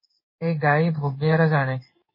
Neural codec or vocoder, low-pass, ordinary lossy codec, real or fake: codec, 16 kHz in and 24 kHz out, 1 kbps, XY-Tokenizer; 5.4 kHz; MP3, 24 kbps; fake